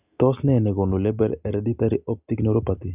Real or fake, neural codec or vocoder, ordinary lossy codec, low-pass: real; none; Opus, 64 kbps; 3.6 kHz